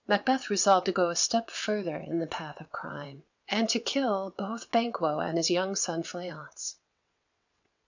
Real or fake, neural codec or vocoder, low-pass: fake; vocoder, 22.05 kHz, 80 mel bands, WaveNeXt; 7.2 kHz